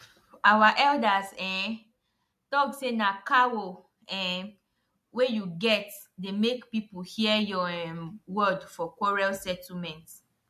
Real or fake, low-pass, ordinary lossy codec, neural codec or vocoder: real; 14.4 kHz; MP3, 64 kbps; none